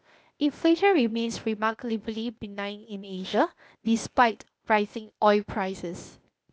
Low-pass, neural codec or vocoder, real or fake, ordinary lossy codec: none; codec, 16 kHz, 0.8 kbps, ZipCodec; fake; none